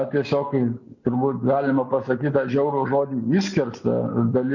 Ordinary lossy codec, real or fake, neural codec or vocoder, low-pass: MP3, 64 kbps; real; none; 7.2 kHz